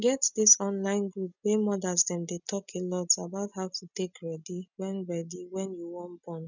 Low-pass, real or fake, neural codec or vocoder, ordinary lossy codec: 7.2 kHz; real; none; none